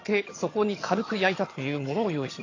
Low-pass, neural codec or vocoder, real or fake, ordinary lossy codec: 7.2 kHz; vocoder, 22.05 kHz, 80 mel bands, HiFi-GAN; fake; AAC, 32 kbps